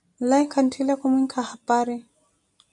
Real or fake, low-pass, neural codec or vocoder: real; 10.8 kHz; none